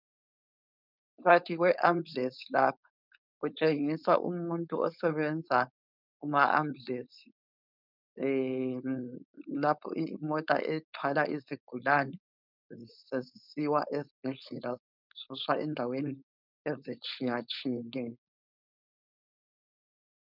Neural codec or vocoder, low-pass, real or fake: codec, 16 kHz, 4.8 kbps, FACodec; 5.4 kHz; fake